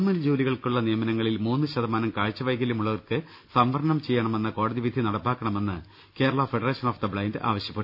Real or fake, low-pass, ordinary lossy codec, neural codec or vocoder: real; 5.4 kHz; none; none